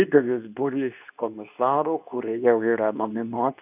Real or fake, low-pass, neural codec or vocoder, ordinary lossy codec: fake; 3.6 kHz; codec, 24 kHz, 0.9 kbps, WavTokenizer, medium speech release version 2; AAC, 32 kbps